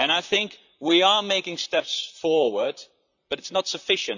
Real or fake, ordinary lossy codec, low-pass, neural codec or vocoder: fake; none; 7.2 kHz; vocoder, 44.1 kHz, 128 mel bands, Pupu-Vocoder